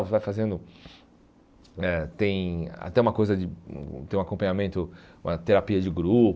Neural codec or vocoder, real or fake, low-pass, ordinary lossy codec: none; real; none; none